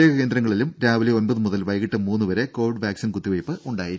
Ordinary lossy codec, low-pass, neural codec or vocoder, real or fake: none; none; none; real